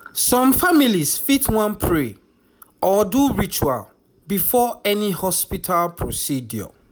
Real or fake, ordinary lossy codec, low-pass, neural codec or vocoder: real; none; none; none